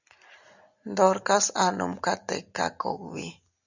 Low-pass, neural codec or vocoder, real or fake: 7.2 kHz; none; real